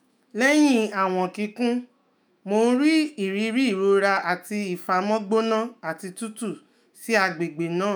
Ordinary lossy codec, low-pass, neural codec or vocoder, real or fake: none; none; autoencoder, 48 kHz, 128 numbers a frame, DAC-VAE, trained on Japanese speech; fake